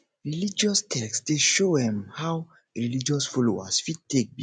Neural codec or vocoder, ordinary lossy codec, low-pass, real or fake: none; none; none; real